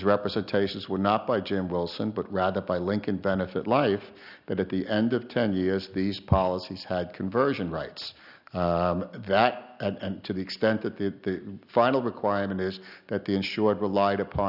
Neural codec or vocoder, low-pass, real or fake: none; 5.4 kHz; real